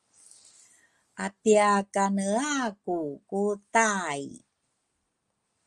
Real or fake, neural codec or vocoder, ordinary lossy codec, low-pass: real; none; Opus, 32 kbps; 10.8 kHz